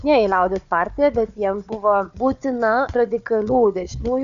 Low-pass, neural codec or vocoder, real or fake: 7.2 kHz; codec, 16 kHz, 4 kbps, FunCodec, trained on Chinese and English, 50 frames a second; fake